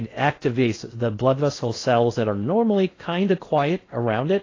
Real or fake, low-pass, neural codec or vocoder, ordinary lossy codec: fake; 7.2 kHz; codec, 16 kHz in and 24 kHz out, 0.8 kbps, FocalCodec, streaming, 65536 codes; AAC, 32 kbps